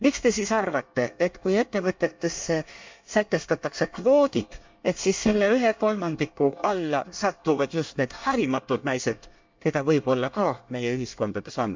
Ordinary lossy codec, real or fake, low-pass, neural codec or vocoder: MP3, 64 kbps; fake; 7.2 kHz; codec, 24 kHz, 1 kbps, SNAC